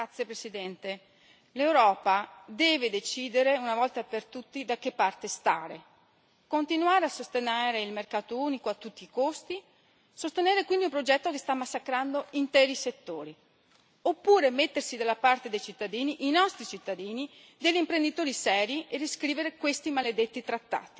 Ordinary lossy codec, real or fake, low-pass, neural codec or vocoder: none; real; none; none